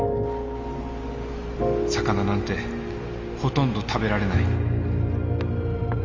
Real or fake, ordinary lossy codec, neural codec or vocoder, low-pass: real; Opus, 32 kbps; none; 7.2 kHz